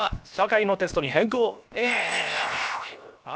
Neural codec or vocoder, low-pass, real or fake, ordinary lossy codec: codec, 16 kHz, 0.7 kbps, FocalCodec; none; fake; none